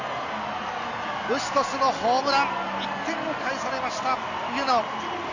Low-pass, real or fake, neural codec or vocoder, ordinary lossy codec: 7.2 kHz; real; none; none